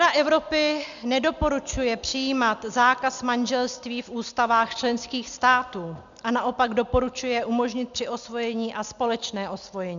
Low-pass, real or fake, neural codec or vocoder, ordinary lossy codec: 7.2 kHz; real; none; MP3, 96 kbps